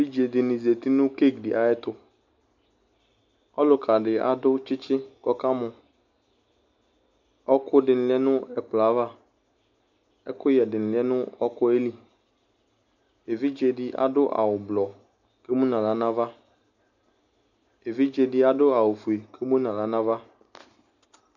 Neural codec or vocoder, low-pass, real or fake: none; 7.2 kHz; real